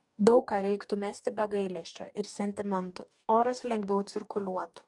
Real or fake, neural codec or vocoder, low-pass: fake; codec, 44.1 kHz, 2.6 kbps, DAC; 10.8 kHz